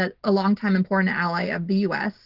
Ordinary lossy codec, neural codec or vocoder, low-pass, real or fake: Opus, 16 kbps; none; 5.4 kHz; real